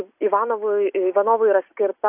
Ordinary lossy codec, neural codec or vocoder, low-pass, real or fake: AAC, 32 kbps; none; 3.6 kHz; real